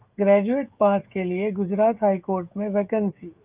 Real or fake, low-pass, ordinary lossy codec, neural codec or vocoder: fake; 3.6 kHz; Opus, 24 kbps; codec, 16 kHz, 16 kbps, FreqCodec, smaller model